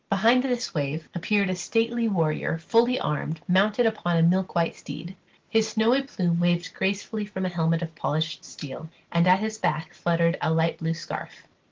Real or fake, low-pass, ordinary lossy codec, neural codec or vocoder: real; 7.2 kHz; Opus, 16 kbps; none